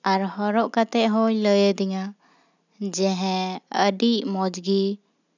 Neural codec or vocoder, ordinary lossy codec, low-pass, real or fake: none; none; 7.2 kHz; real